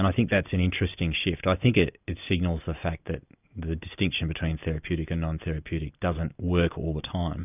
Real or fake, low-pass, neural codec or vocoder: real; 3.6 kHz; none